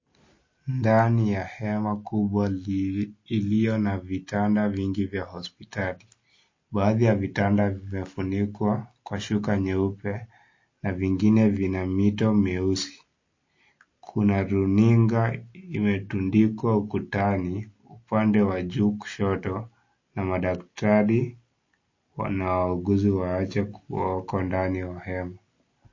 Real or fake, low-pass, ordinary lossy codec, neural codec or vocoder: real; 7.2 kHz; MP3, 32 kbps; none